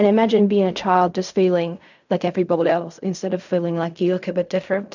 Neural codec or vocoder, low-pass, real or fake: codec, 16 kHz in and 24 kHz out, 0.4 kbps, LongCat-Audio-Codec, fine tuned four codebook decoder; 7.2 kHz; fake